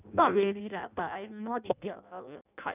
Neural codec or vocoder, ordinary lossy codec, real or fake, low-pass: codec, 16 kHz in and 24 kHz out, 0.6 kbps, FireRedTTS-2 codec; none; fake; 3.6 kHz